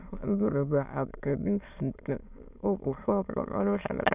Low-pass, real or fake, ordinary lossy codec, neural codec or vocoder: 3.6 kHz; fake; none; autoencoder, 22.05 kHz, a latent of 192 numbers a frame, VITS, trained on many speakers